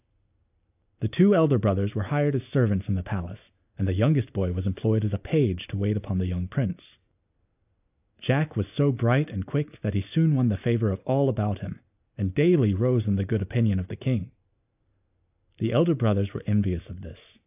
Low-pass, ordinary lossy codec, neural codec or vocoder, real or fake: 3.6 kHz; AAC, 32 kbps; none; real